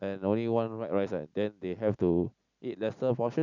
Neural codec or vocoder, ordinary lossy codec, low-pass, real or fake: none; none; 7.2 kHz; real